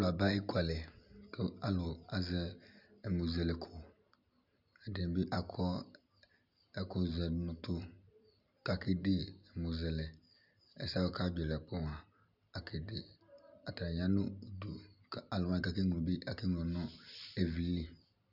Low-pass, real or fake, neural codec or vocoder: 5.4 kHz; real; none